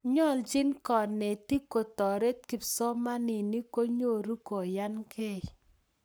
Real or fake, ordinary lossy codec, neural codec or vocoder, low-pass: fake; none; codec, 44.1 kHz, 7.8 kbps, Pupu-Codec; none